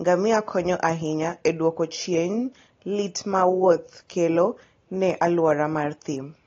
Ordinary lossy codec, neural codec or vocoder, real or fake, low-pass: AAC, 32 kbps; none; real; 7.2 kHz